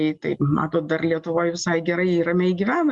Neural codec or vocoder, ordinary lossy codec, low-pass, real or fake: none; Opus, 64 kbps; 10.8 kHz; real